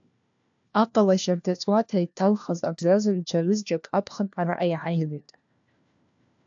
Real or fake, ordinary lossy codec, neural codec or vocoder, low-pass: fake; AAC, 64 kbps; codec, 16 kHz, 1 kbps, FunCodec, trained on LibriTTS, 50 frames a second; 7.2 kHz